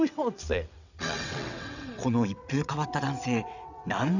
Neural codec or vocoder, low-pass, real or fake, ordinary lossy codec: vocoder, 22.05 kHz, 80 mel bands, WaveNeXt; 7.2 kHz; fake; none